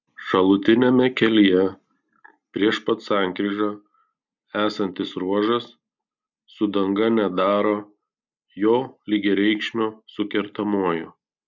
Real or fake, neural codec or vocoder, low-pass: real; none; 7.2 kHz